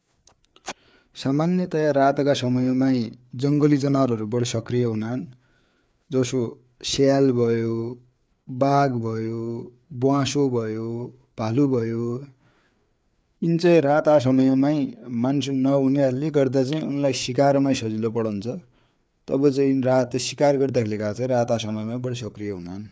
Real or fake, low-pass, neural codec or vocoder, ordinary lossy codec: fake; none; codec, 16 kHz, 4 kbps, FreqCodec, larger model; none